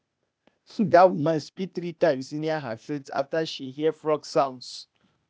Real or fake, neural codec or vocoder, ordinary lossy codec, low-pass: fake; codec, 16 kHz, 0.8 kbps, ZipCodec; none; none